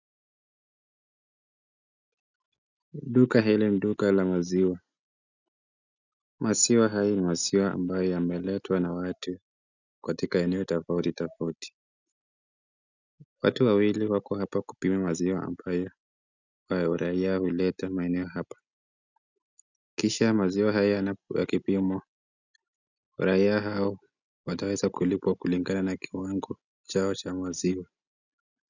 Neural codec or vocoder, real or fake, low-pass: none; real; 7.2 kHz